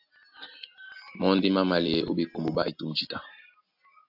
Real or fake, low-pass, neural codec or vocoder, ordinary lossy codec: real; 5.4 kHz; none; AAC, 48 kbps